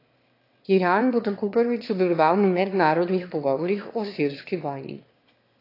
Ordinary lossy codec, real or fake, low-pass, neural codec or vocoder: AAC, 32 kbps; fake; 5.4 kHz; autoencoder, 22.05 kHz, a latent of 192 numbers a frame, VITS, trained on one speaker